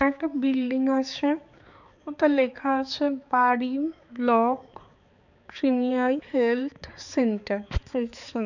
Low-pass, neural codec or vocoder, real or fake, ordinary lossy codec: 7.2 kHz; codec, 16 kHz, 4 kbps, X-Codec, HuBERT features, trained on balanced general audio; fake; none